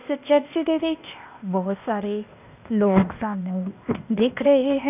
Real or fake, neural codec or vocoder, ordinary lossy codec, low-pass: fake; codec, 16 kHz, 0.8 kbps, ZipCodec; none; 3.6 kHz